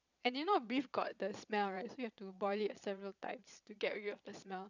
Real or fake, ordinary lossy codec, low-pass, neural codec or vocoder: fake; none; 7.2 kHz; vocoder, 22.05 kHz, 80 mel bands, Vocos